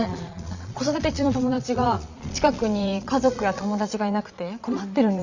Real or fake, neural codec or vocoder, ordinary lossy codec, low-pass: fake; vocoder, 22.05 kHz, 80 mel bands, Vocos; Opus, 64 kbps; 7.2 kHz